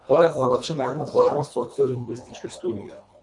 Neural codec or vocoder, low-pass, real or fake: codec, 24 kHz, 1.5 kbps, HILCodec; 10.8 kHz; fake